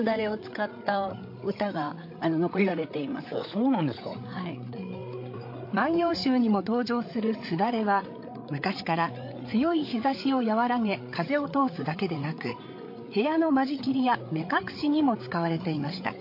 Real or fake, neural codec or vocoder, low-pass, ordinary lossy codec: fake; codec, 16 kHz, 8 kbps, FreqCodec, larger model; 5.4 kHz; MP3, 32 kbps